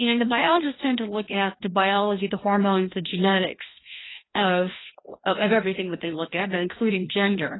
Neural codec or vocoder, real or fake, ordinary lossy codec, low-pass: codec, 16 kHz, 1 kbps, FreqCodec, larger model; fake; AAC, 16 kbps; 7.2 kHz